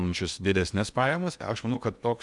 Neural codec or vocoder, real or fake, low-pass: codec, 16 kHz in and 24 kHz out, 0.8 kbps, FocalCodec, streaming, 65536 codes; fake; 10.8 kHz